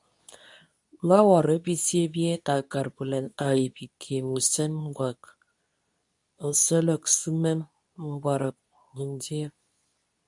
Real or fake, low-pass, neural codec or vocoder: fake; 10.8 kHz; codec, 24 kHz, 0.9 kbps, WavTokenizer, medium speech release version 2